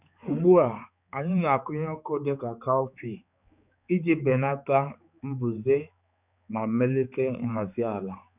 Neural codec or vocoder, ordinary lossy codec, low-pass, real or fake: codec, 16 kHz, 4 kbps, X-Codec, HuBERT features, trained on balanced general audio; none; 3.6 kHz; fake